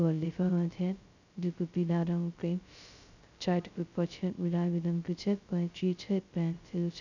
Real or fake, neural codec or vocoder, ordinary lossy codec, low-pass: fake; codec, 16 kHz, 0.2 kbps, FocalCodec; none; 7.2 kHz